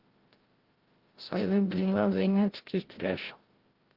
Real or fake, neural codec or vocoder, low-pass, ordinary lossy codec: fake; codec, 16 kHz, 0.5 kbps, FreqCodec, larger model; 5.4 kHz; Opus, 16 kbps